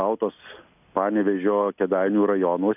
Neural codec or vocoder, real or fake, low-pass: none; real; 3.6 kHz